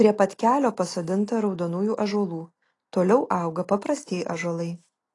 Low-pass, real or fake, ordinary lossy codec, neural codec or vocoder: 10.8 kHz; real; AAC, 32 kbps; none